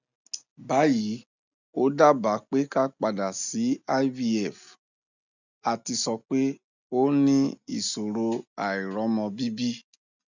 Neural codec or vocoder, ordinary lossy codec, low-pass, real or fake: none; none; 7.2 kHz; real